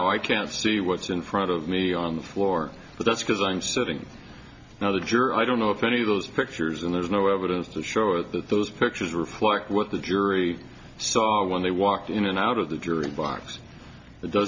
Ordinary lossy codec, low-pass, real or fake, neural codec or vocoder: MP3, 48 kbps; 7.2 kHz; real; none